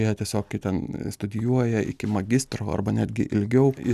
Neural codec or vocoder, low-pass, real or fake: none; 14.4 kHz; real